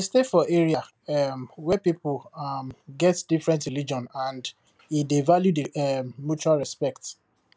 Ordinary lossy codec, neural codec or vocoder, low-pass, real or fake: none; none; none; real